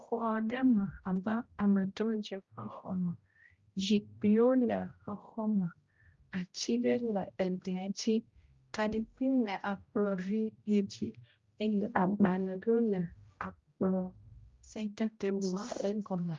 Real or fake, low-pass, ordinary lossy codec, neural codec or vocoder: fake; 7.2 kHz; Opus, 32 kbps; codec, 16 kHz, 0.5 kbps, X-Codec, HuBERT features, trained on general audio